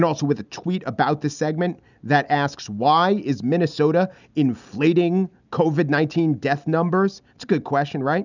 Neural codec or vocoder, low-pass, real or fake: none; 7.2 kHz; real